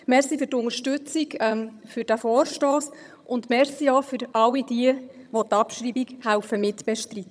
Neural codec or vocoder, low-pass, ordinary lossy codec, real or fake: vocoder, 22.05 kHz, 80 mel bands, HiFi-GAN; none; none; fake